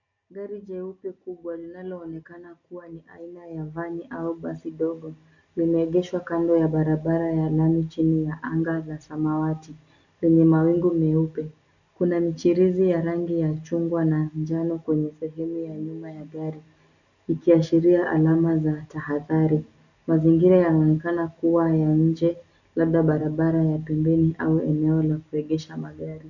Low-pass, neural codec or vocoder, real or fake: 7.2 kHz; none; real